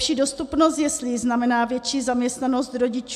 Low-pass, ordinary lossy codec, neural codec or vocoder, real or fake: 14.4 kHz; AAC, 96 kbps; none; real